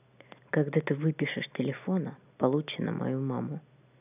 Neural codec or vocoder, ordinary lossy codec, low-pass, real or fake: none; none; 3.6 kHz; real